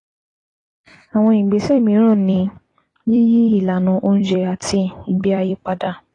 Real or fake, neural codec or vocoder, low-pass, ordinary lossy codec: fake; vocoder, 44.1 kHz, 128 mel bands every 256 samples, BigVGAN v2; 10.8 kHz; AAC, 32 kbps